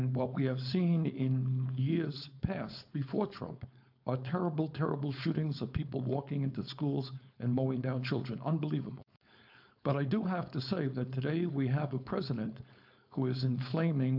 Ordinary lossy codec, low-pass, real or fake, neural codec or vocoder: AAC, 48 kbps; 5.4 kHz; fake; codec, 16 kHz, 4.8 kbps, FACodec